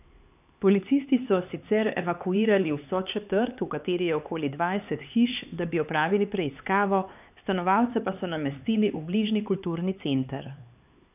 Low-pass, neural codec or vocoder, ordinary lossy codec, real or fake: 3.6 kHz; codec, 16 kHz, 4 kbps, X-Codec, HuBERT features, trained on LibriSpeech; none; fake